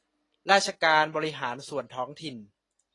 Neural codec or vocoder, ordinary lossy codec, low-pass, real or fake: none; AAC, 32 kbps; 10.8 kHz; real